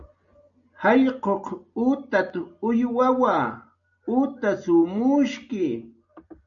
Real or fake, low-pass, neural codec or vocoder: real; 7.2 kHz; none